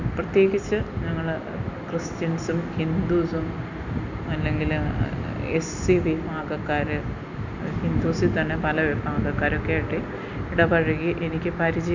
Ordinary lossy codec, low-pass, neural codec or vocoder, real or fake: none; 7.2 kHz; none; real